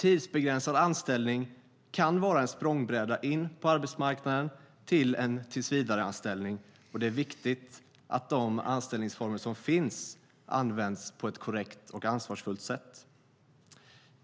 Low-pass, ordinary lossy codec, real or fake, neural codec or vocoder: none; none; real; none